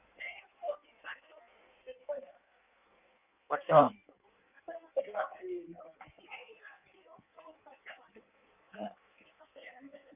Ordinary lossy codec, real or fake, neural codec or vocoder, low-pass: none; fake; codec, 16 kHz in and 24 kHz out, 1.1 kbps, FireRedTTS-2 codec; 3.6 kHz